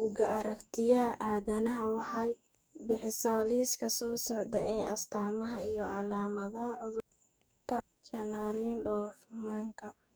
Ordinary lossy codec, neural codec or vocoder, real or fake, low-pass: none; codec, 44.1 kHz, 2.6 kbps, DAC; fake; none